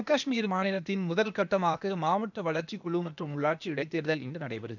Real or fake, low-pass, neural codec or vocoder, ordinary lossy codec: fake; 7.2 kHz; codec, 16 kHz, 0.8 kbps, ZipCodec; none